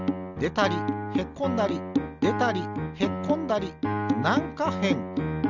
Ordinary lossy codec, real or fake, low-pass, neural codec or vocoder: none; real; 7.2 kHz; none